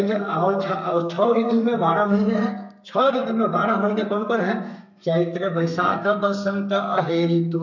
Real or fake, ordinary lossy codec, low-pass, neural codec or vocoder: fake; MP3, 64 kbps; 7.2 kHz; codec, 32 kHz, 1.9 kbps, SNAC